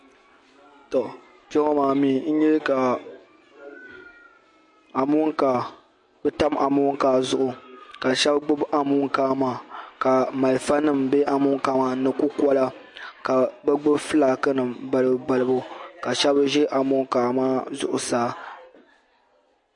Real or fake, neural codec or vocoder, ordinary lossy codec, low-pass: real; none; MP3, 48 kbps; 10.8 kHz